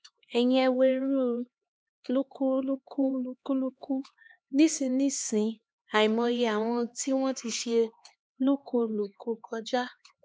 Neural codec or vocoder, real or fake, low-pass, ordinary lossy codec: codec, 16 kHz, 2 kbps, X-Codec, HuBERT features, trained on LibriSpeech; fake; none; none